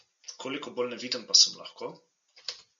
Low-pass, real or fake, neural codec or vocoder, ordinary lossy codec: 7.2 kHz; real; none; MP3, 64 kbps